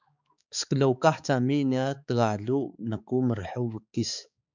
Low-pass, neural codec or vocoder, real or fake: 7.2 kHz; codec, 16 kHz, 4 kbps, X-Codec, HuBERT features, trained on balanced general audio; fake